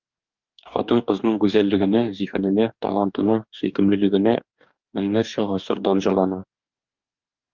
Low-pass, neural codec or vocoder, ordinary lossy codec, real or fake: 7.2 kHz; codec, 44.1 kHz, 2.6 kbps, DAC; Opus, 32 kbps; fake